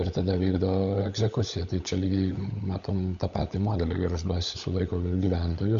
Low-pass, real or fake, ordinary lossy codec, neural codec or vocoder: 7.2 kHz; fake; Opus, 64 kbps; codec, 16 kHz, 16 kbps, FunCodec, trained on LibriTTS, 50 frames a second